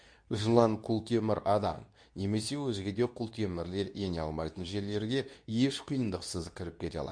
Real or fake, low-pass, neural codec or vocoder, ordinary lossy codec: fake; 9.9 kHz; codec, 24 kHz, 0.9 kbps, WavTokenizer, medium speech release version 2; MP3, 64 kbps